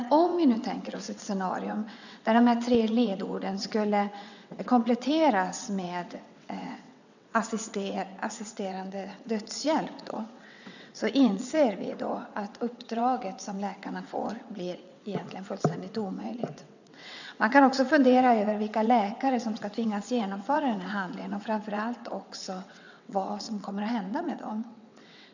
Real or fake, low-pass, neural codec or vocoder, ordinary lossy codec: fake; 7.2 kHz; vocoder, 22.05 kHz, 80 mel bands, WaveNeXt; none